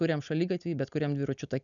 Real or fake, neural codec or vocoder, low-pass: real; none; 7.2 kHz